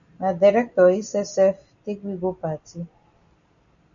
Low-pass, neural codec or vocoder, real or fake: 7.2 kHz; none; real